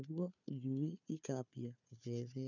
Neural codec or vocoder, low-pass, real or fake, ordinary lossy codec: codec, 16 kHz, 4 kbps, FunCodec, trained on Chinese and English, 50 frames a second; 7.2 kHz; fake; none